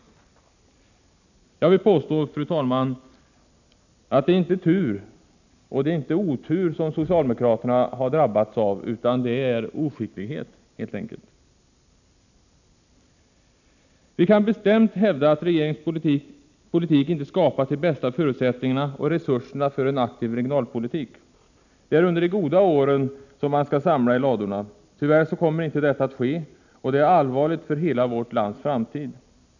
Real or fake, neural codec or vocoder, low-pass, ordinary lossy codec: real; none; 7.2 kHz; none